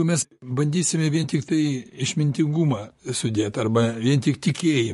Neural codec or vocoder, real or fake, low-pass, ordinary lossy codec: vocoder, 44.1 kHz, 128 mel bands, Pupu-Vocoder; fake; 14.4 kHz; MP3, 48 kbps